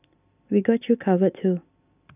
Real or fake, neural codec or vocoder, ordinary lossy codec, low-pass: real; none; none; 3.6 kHz